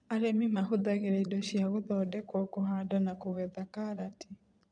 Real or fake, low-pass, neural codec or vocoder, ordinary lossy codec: fake; 9.9 kHz; vocoder, 22.05 kHz, 80 mel bands, Vocos; none